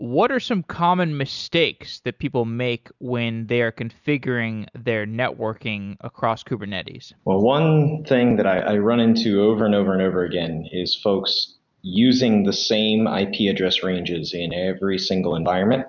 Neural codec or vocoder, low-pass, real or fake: none; 7.2 kHz; real